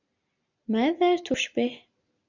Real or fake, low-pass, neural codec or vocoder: real; 7.2 kHz; none